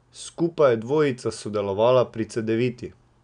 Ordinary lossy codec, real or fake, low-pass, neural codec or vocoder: none; real; 9.9 kHz; none